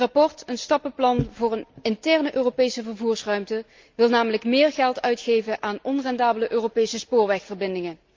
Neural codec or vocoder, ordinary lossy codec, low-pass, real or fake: none; Opus, 24 kbps; 7.2 kHz; real